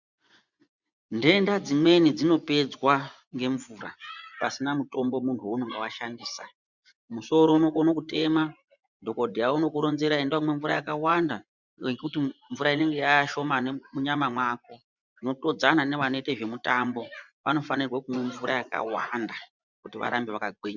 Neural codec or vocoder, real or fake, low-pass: vocoder, 24 kHz, 100 mel bands, Vocos; fake; 7.2 kHz